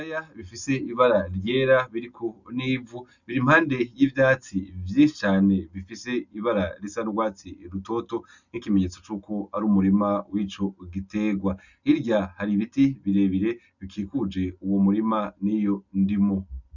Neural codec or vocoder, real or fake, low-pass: none; real; 7.2 kHz